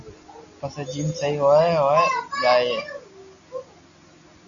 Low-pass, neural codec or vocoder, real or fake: 7.2 kHz; none; real